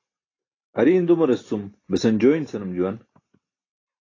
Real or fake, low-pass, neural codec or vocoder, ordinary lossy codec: real; 7.2 kHz; none; AAC, 32 kbps